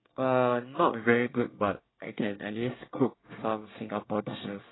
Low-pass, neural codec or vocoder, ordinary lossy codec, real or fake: 7.2 kHz; codec, 24 kHz, 1 kbps, SNAC; AAC, 16 kbps; fake